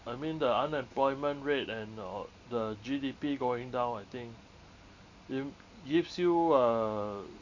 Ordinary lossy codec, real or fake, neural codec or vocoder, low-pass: none; real; none; 7.2 kHz